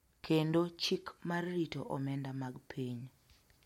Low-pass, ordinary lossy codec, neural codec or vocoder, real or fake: 19.8 kHz; MP3, 64 kbps; none; real